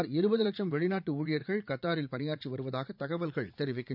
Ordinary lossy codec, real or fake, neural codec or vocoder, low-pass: none; fake; vocoder, 44.1 kHz, 80 mel bands, Vocos; 5.4 kHz